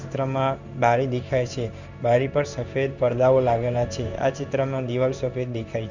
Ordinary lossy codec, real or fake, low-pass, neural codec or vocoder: none; fake; 7.2 kHz; codec, 16 kHz in and 24 kHz out, 1 kbps, XY-Tokenizer